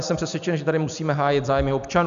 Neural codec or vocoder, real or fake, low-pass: none; real; 7.2 kHz